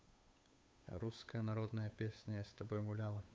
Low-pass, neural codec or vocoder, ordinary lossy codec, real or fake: none; codec, 16 kHz, 8 kbps, FunCodec, trained on Chinese and English, 25 frames a second; none; fake